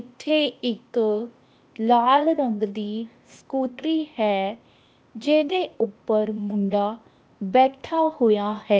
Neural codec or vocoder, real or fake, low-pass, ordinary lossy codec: codec, 16 kHz, 0.8 kbps, ZipCodec; fake; none; none